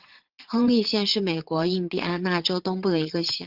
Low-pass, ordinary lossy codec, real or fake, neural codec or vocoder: 5.4 kHz; Opus, 16 kbps; fake; vocoder, 44.1 kHz, 80 mel bands, Vocos